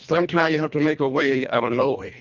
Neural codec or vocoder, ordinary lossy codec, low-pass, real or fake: codec, 24 kHz, 1.5 kbps, HILCodec; Opus, 64 kbps; 7.2 kHz; fake